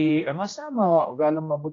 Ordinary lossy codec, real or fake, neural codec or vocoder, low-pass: AAC, 32 kbps; fake; codec, 16 kHz, 1 kbps, X-Codec, HuBERT features, trained on general audio; 7.2 kHz